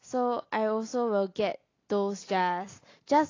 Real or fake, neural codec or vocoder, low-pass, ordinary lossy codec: real; none; 7.2 kHz; AAC, 32 kbps